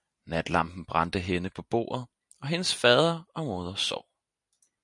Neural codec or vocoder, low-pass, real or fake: none; 10.8 kHz; real